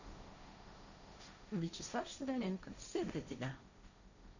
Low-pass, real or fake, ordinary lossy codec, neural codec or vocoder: 7.2 kHz; fake; none; codec, 16 kHz, 1.1 kbps, Voila-Tokenizer